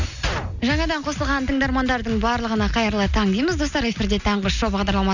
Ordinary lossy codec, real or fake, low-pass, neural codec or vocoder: none; real; 7.2 kHz; none